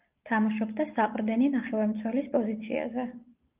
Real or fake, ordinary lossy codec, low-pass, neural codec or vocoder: real; Opus, 32 kbps; 3.6 kHz; none